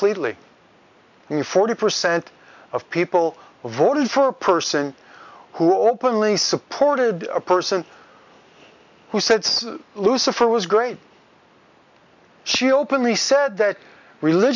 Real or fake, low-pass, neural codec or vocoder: real; 7.2 kHz; none